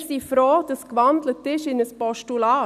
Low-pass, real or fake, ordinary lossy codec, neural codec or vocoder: 14.4 kHz; real; none; none